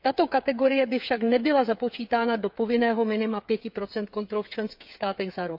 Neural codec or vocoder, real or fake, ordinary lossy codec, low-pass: codec, 16 kHz, 8 kbps, FreqCodec, smaller model; fake; AAC, 48 kbps; 5.4 kHz